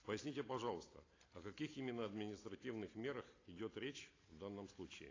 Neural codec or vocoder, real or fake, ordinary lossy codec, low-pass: none; real; AAC, 32 kbps; 7.2 kHz